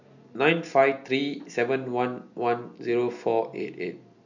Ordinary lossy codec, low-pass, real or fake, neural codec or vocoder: none; 7.2 kHz; real; none